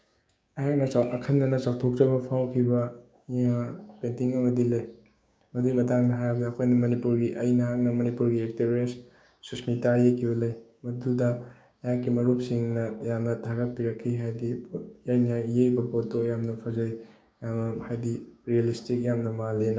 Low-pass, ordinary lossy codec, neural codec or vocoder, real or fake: none; none; codec, 16 kHz, 6 kbps, DAC; fake